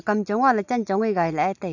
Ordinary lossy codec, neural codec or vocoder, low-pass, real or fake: none; none; 7.2 kHz; real